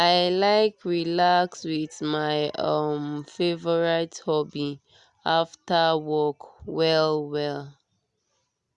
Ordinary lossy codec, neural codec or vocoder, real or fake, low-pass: Opus, 64 kbps; none; real; 10.8 kHz